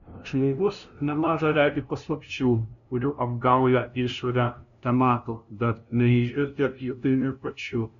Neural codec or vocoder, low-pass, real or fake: codec, 16 kHz, 0.5 kbps, FunCodec, trained on LibriTTS, 25 frames a second; 7.2 kHz; fake